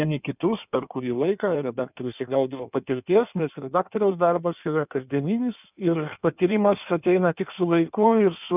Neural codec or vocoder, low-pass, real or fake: codec, 16 kHz in and 24 kHz out, 1.1 kbps, FireRedTTS-2 codec; 3.6 kHz; fake